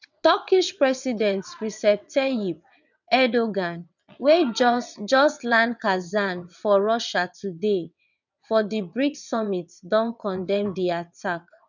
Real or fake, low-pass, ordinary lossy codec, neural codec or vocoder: fake; 7.2 kHz; none; vocoder, 22.05 kHz, 80 mel bands, WaveNeXt